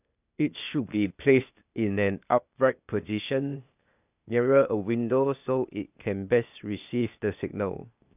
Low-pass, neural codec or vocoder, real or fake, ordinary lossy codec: 3.6 kHz; codec, 16 kHz, 0.8 kbps, ZipCodec; fake; none